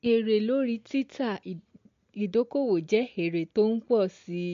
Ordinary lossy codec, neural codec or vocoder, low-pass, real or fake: MP3, 48 kbps; none; 7.2 kHz; real